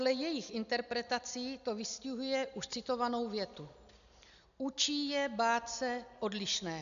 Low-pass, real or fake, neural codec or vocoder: 7.2 kHz; real; none